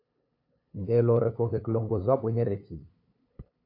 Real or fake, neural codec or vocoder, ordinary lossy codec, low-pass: fake; codec, 16 kHz, 8 kbps, FunCodec, trained on LibriTTS, 25 frames a second; AAC, 32 kbps; 5.4 kHz